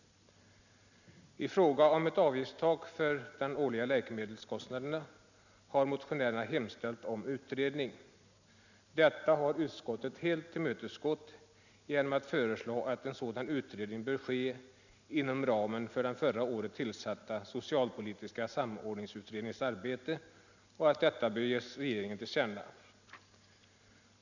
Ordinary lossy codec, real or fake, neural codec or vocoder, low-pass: none; real; none; 7.2 kHz